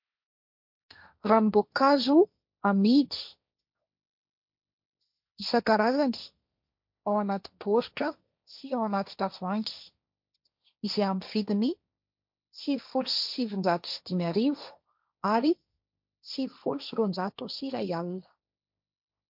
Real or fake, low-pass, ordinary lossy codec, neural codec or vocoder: fake; 5.4 kHz; MP3, 48 kbps; codec, 16 kHz, 1.1 kbps, Voila-Tokenizer